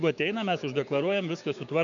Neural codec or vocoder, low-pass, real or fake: codec, 16 kHz, 6 kbps, DAC; 7.2 kHz; fake